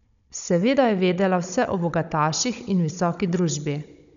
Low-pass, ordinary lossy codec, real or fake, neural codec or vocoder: 7.2 kHz; none; fake; codec, 16 kHz, 16 kbps, FunCodec, trained on Chinese and English, 50 frames a second